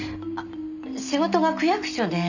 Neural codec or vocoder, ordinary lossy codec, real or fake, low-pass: none; none; real; 7.2 kHz